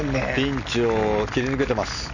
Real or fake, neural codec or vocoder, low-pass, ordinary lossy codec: real; none; 7.2 kHz; none